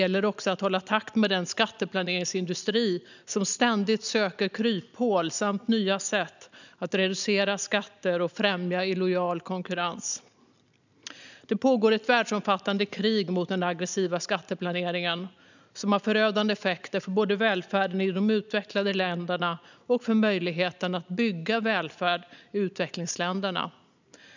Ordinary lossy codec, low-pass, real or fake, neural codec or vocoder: none; 7.2 kHz; real; none